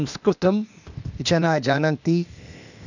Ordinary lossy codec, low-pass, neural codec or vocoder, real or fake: none; 7.2 kHz; codec, 16 kHz, 0.8 kbps, ZipCodec; fake